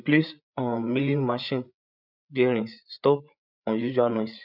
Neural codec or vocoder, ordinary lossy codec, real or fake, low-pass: codec, 16 kHz, 8 kbps, FreqCodec, larger model; none; fake; 5.4 kHz